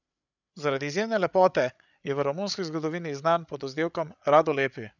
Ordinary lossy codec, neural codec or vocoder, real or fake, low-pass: none; codec, 16 kHz, 8 kbps, FreqCodec, larger model; fake; 7.2 kHz